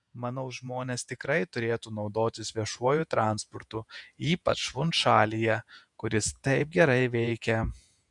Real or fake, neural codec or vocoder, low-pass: fake; vocoder, 48 kHz, 128 mel bands, Vocos; 10.8 kHz